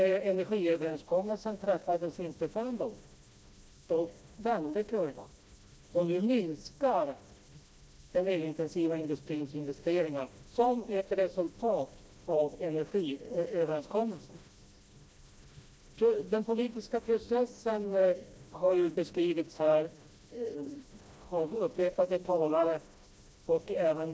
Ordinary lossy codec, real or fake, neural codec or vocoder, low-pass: none; fake; codec, 16 kHz, 1 kbps, FreqCodec, smaller model; none